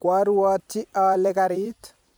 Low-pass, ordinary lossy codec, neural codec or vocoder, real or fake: none; none; vocoder, 44.1 kHz, 128 mel bands every 256 samples, BigVGAN v2; fake